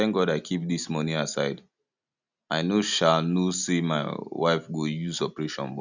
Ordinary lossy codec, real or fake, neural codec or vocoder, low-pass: none; real; none; 7.2 kHz